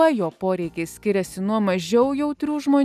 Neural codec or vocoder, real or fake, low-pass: none; real; 14.4 kHz